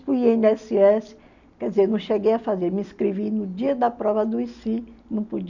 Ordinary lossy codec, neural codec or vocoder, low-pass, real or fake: none; none; 7.2 kHz; real